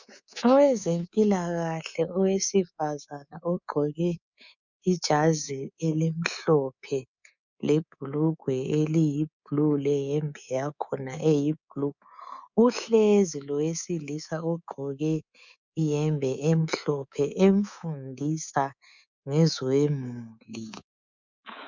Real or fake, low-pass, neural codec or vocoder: fake; 7.2 kHz; codec, 24 kHz, 3.1 kbps, DualCodec